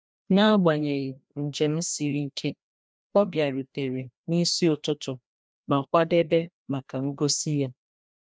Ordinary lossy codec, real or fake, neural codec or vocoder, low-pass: none; fake; codec, 16 kHz, 1 kbps, FreqCodec, larger model; none